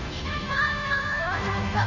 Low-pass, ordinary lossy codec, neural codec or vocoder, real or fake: 7.2 kHz; none; codec, 16 kHz, 0.5 kbps, FunCodec, trained on Chinese and English, 25 frames a second; fake